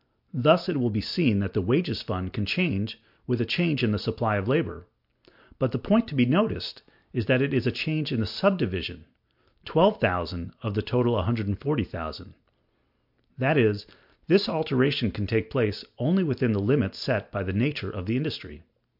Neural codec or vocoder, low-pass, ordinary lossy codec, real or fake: none; 5.4 kHz; MP3, 48 kbps; real